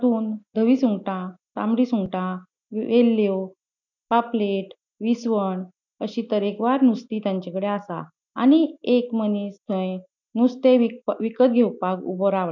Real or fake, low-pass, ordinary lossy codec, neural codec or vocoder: real; 7.2 kHz; none; none